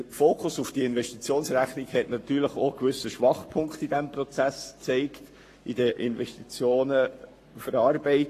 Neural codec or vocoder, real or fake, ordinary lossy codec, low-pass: codec, 44.1 kHz, 7.8 kbps, Pupu-Codec; fake; AAC, 48 kbps; 14.4 kHz